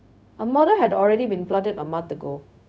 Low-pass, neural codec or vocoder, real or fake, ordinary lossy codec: none; codec, 16 kHz, 0.4 kbps, LongCat-Audio-Codec; fake; none